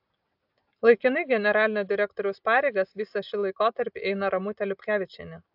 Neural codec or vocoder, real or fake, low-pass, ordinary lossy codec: none; real; 5.4 kHz; AAC, 48 kbps